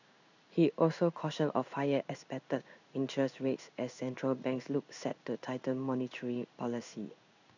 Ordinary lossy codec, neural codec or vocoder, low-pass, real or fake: none; codec, 16 kHz in and 24 kHz out, 1 kbps, XY-Tokenizer; 7.2 kHz; fake